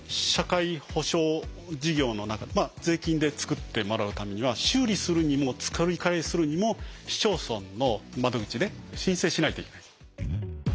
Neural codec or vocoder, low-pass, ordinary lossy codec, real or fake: none; none; none; real